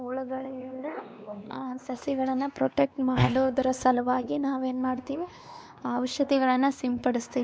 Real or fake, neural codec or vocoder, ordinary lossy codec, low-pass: fake; codec, 16 kHz, 2 kbps, X-Codec, WavLM features, trained on Multilingual LibriSpeech; none; none